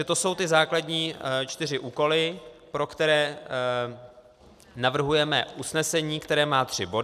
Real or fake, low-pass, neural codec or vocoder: real; 14.4 kHz; none